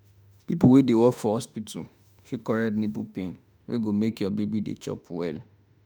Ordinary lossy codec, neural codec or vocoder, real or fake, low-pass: none; autoencoder, 48 kHz, 32 numbers a frame, DAC-VAE, trained on Japanese speech; fake; none